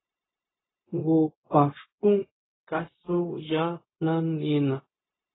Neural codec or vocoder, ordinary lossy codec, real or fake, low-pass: codec, 16 kHz, 0.4 kbps, LongCat-Audio-Codec; AAC, 16 kbps; fake; 7.2 kHz